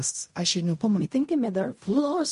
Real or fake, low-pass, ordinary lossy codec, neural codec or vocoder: fake; 10.8 kHz; MP3, 48 kbps; codec, 16 kHz in and 24 kHz out, 0.4 kbps, LongCat-Audio-Codec, fine tuned four codebook decoder